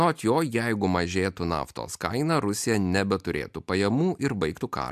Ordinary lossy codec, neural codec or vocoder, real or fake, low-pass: MP3, 96 kbps; none; real; 14.4 kHz